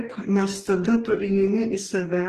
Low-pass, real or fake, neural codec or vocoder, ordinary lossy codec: 14.4 kHz; fake; codec, 44.1 kHz, 2.6 kbps, DAC; Opus, 16 kbps